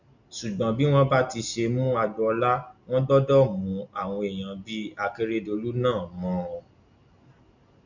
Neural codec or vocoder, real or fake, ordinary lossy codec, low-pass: none; real; none; 7.2 kHz